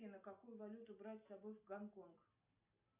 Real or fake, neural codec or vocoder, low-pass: real; none; 3.6 kHz